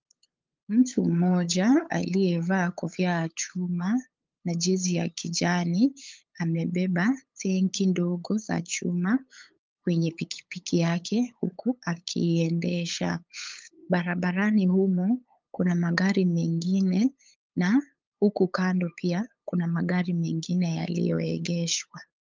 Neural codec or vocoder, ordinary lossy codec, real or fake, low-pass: codec, 16 kHz, 8 kbps, FunCodec, trained on LibriTTS, 25 frames a second; Opus, 24 kbps; fake; 7.2 kHz